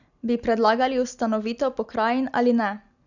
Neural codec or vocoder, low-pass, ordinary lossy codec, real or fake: none; 7.2 kHz; none; real